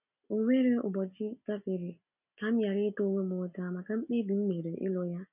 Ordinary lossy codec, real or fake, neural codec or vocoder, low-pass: none; real; none; 3.6 kHz